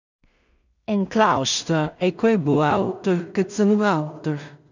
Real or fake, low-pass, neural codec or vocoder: fake; 7.2 kHz; codec, 16 kHz in and 24 kHz out, 0.4 kbps, LongCat-Audio-Codec, two codebook decoder